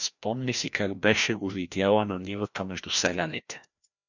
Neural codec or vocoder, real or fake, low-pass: codec, 16 kHz, 1 kbps, FreqCodec, larger model; fake; 7.2 kHz